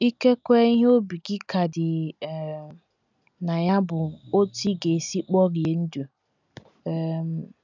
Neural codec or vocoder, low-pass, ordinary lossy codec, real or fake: none; 7.2 kHz; none; real